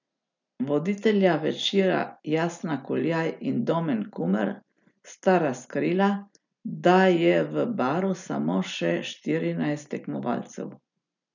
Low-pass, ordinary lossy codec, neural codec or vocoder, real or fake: 7.2 kHz; none; none; real